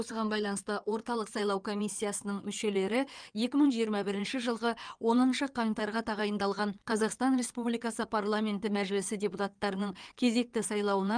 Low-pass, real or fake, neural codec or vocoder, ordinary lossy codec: 9.9 kHz; fake; codec, 16 kHz in and 24 kHz out, 2.2 kbps, FireRedTTS-2 codec; Opus, 32 kbps